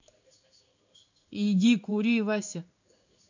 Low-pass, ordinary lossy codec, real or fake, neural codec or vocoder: 7.2 kHz; none; fake; codec, 16 kHz in and 24 kHz out, 1 kbps, XY-Tokenizer